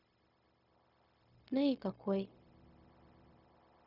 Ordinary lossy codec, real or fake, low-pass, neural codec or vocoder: none; fake; 5.4 kHz; codec, 16 kHz, 0.4 kbps, LongCat-Audio-Codec